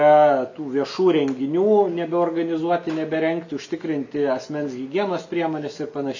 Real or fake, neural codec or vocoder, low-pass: real; none; 7.2 kHz